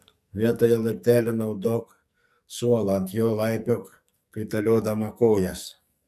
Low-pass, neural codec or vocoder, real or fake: 14.4 kHz; codec, 44.1 kHz, 2.6 kbps, SNAC; fake